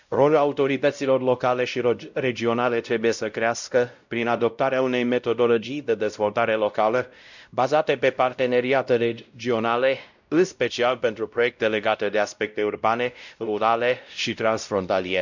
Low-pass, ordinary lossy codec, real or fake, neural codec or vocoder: 7.2 kHz; none; fake; codec, 16 kHz, 0.5 kbps, X-Codec, WavLM features, trained on Multilingual LibriSpeech